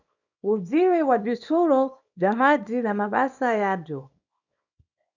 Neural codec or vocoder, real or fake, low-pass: codec, 24 kHz, 0.9 kbps, WavTokenizer, small release; fake; 7.2 kHz